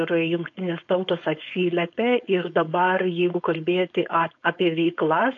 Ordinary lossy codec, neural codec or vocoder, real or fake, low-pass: AAC, 48 kbps; codec, 16 kHz, 4.8 kbps, FACodec; fake; 7.2 kHz